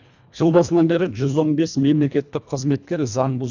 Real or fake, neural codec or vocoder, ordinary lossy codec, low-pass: fake; codec, 24 kHz, 1.5 kbps, HILCodec; none; 7.2 kHz